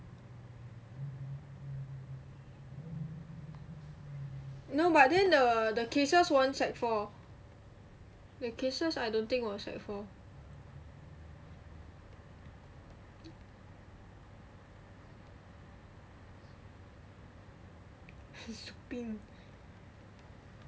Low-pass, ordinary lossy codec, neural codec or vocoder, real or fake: none; none; none; real